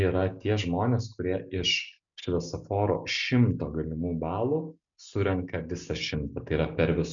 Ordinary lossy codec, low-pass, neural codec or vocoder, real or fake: MP3, 96 kbps; 7.2 kHz; none; real